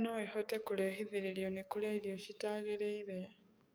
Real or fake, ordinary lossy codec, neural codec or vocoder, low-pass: fake; none; codec, 44.1 kHz, 7.8 kbps, DAC; none